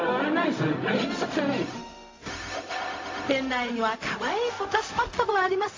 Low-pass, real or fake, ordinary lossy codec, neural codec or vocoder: 7.2 kHz; fake; AAC, 32 kbps; codec, 16 kHz, 0.4 kbps, LongCat-Audio-Codec